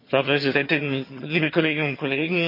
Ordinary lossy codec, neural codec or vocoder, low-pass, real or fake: MP3, 32 kbps; vocoder, 22.05 kHz, 80 mel bands, HiFi-GAN; 5.4 kHz; fake